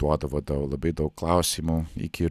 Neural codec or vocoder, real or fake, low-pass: none; real; 14.4 kHz